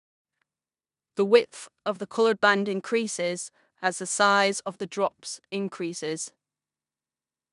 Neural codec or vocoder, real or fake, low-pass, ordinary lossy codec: codec, 16 kHz in and 24 kHz out, 0.9 kbps, LongCat-Audio-Codec, four codebook decoder; fake; 10.8 kHz; none